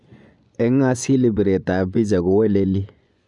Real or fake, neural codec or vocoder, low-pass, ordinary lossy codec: fake; vocoder, 24 kHz, 100 mel bands, Vocos; 10.8 kHz; MP3, 96 kbps